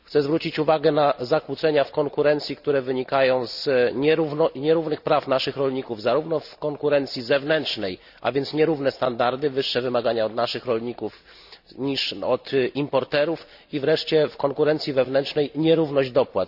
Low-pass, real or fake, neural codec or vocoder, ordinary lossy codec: 5.4 kHz; real; none; none